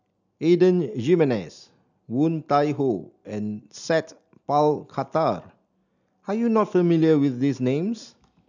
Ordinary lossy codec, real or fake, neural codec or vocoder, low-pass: none; real; none; 7.2 kHz